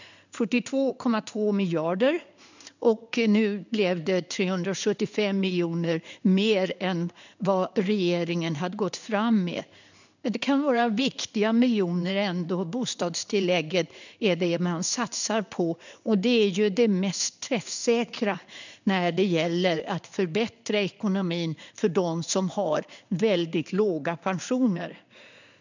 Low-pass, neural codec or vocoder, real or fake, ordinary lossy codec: 7.2 kHz; codec, 16 kHz in and 24 kHz out, 1 kbps, XY-Tokenizer; fake; none